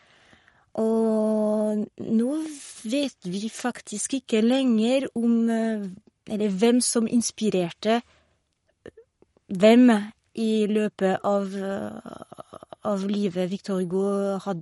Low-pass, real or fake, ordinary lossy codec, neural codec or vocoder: 19.8 kHz; fake; MP3, 48 kbps; codec, 44.1 kHz, 7.8 kbps, Pupu-Codec